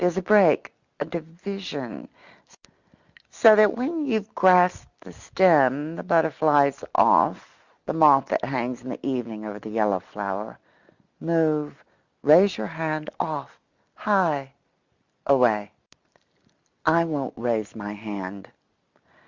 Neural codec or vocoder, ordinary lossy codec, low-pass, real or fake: none; Opus, 64 kbps; 7.2 kHz; real